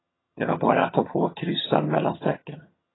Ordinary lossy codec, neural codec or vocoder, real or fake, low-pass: AAC, 16 kbps; vocoder, 22.05 kHz, 80 mel bands, HiFi-GAN; fake; 7.2 kHz